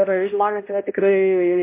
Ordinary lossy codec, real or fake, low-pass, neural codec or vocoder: MP3, 24 kbps; fake; 3.6 kHz; codec, 16 kHz, 0.5 kbps, X-Codec, HuBERT features, trained on balanced general audio